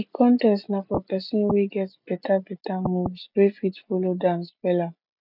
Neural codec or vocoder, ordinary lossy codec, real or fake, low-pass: none; none; real; 5.4 kHz